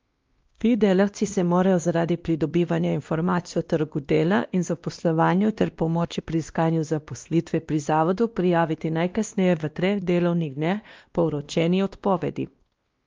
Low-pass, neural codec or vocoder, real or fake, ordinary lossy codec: 7.2 kHz; codec, 16 kHz, 1 kbps, X-Codec, WavLM features, trained on Multilingual LibriSpeech; fake; Opus, 32 kbps